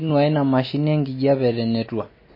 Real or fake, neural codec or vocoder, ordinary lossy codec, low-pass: real; none; MP3, 24 kbps; 5.4 kHz